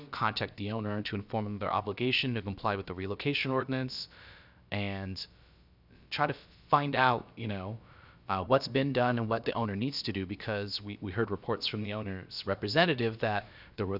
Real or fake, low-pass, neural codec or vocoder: fake; 5.4 kHz; codec, 16 kHz, about 1 kbps, DyCAST, with the encoder's durations